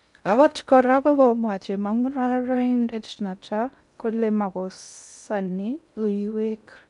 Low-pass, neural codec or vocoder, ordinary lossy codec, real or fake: 10.8 kHz; codec, 16 kHz in and 24 kHz out, 0.6 kbps, FocalCodec, streaming, 4096 codes; none; fake